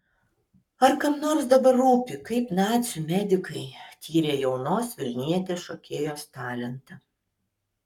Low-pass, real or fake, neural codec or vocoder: 19.8 kHz; fake; codec, 44.1 kHz, 7.8 kbps, Pupu-Codec